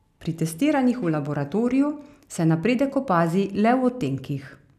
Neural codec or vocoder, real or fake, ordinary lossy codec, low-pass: none; real; none; 14.4 kHz